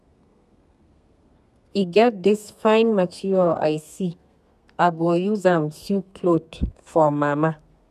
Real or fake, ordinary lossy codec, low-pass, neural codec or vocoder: fake; none; 14.4 kHz; codec, 32 kHz, 1.9 kbps, SNAC